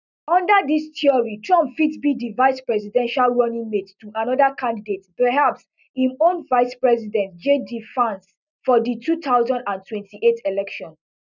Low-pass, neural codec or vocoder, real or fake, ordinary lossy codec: 7.2 kHz; none; real; none